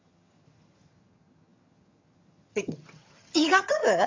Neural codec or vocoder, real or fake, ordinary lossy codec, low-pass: vocoder, 22.05 kHz, 80 mel bands, HiFi-GAN; fake; MP3, 48 kbps; 7.2 kHz